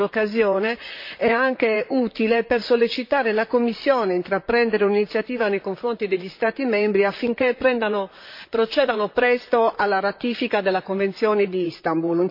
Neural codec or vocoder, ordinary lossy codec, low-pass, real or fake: vocoder, 44.1 kHz, 128 mel bands, Pupu-Vocoder; MP3, 32 kbps; 5.4 kHz; fake